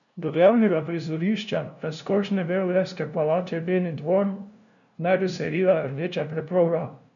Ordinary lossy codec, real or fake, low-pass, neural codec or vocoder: none; fake; 7.2 kHz; codec, 16 kHz, 0.5 kbps, FunCodec, trained on LibriTTS, 25 frames a second